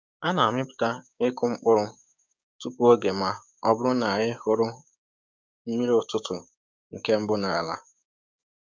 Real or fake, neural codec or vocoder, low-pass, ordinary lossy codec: fake; codec, 16 kHz, 6 kbps, DAC; none; none